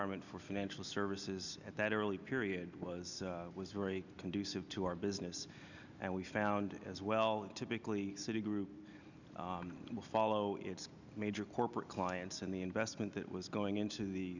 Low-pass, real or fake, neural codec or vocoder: 7.2 kHz; real; none